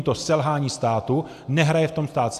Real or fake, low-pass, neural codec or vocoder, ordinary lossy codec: real; 14.4 kHz; none; AAC, 96 kbps